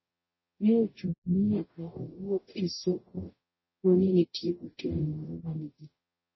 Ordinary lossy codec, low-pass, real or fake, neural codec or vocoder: MP3, 24 kbps; 7.2 kHz; fake; codec, 44.1 kHz, 0.9 kbps, DAC